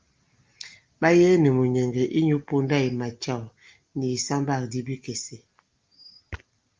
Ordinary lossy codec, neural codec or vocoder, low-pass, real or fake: Opus, 24 kbps; none; 7.2 kHz; real